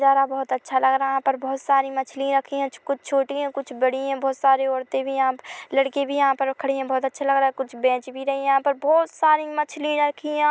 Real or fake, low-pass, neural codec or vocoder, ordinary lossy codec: real; none; none; none